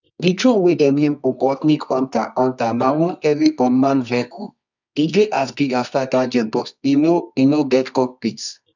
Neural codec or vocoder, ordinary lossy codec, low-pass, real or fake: codec, 24 kHz, 0.9 kbps, WavTokenizer, medium music audio release; none; 7.2 kHz; fake